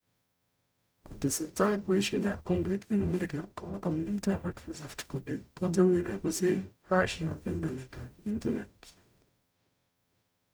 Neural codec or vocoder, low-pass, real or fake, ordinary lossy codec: codec, 44.1 kHz, 0.9 kbps, DAC; none; fake; none